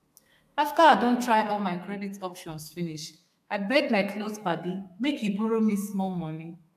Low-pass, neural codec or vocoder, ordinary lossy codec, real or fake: 14.4 kHz; codec, 32 kHz, 1.9 kbps, SNAC; AAC, 96 kbps; fake